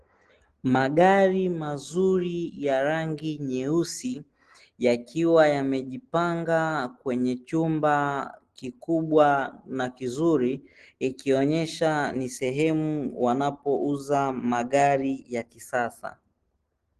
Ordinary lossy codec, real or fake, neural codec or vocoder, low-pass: Opus, 16 kbps; real; none; 10.8 kHz